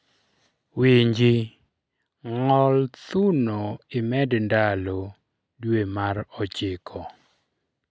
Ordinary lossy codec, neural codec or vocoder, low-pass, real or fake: none; none; none; real